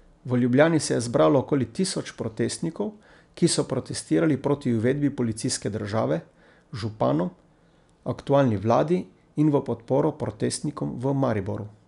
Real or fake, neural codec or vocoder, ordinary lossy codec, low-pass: real; none; none; 10.8 kHz